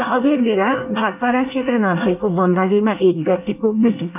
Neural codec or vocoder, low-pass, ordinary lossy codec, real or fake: codec, 24 kHz, 1 kbps, SNAC; 3.6 kHz; none; fake